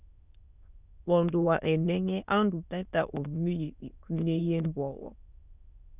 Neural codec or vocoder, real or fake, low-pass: autoencoder, 22.05 kHz, a latent of 192 numbers a frame, VITS, trained on many speakers; fake; 3.6 kHz